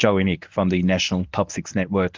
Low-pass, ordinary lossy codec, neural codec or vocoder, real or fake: 7.2 kHz; Opus, 32 kbps; codec, 24 kHz, 0.9 kbps, WavTokenizer, medium speech release version 1; fake